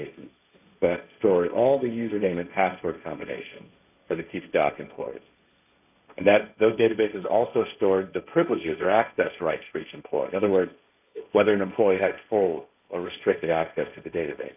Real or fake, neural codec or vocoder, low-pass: fake; codec, 16 kHz, 1.1 kbps, Voila-Tokenizer; 3.6 kHz